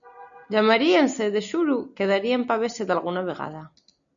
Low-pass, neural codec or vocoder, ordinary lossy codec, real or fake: 7.2 kHz; none; MP3, 96 kbps; real